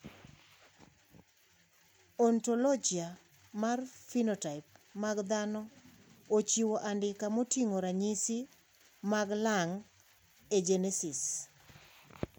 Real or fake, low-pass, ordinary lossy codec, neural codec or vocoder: real; none; none; none